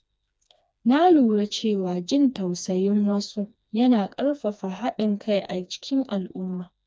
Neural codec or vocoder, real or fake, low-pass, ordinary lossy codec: codec, 16 kHz, 2 kbps, FreqCodec, smaller model; fake; none; none